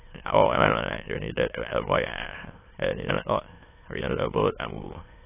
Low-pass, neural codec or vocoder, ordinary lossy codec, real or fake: 3.6 kHz; autoencoder, 22.05 kHz, a latent of 192 numbers a frame, VITS, trained on many speakers; AAC, 16 kbps; fake